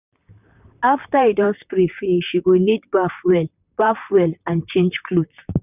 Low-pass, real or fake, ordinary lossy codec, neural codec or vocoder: 3.6 kHz; fake; none; vocoder, 44.1 kHz, 128 mel bands, Pupu-Vocoder